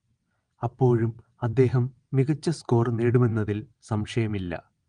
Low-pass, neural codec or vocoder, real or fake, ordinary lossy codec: 9.9 kHz; vocoder, 22.05 kHz, 80 mel bands, WaveNeXt; fake; Opus, 32 kbps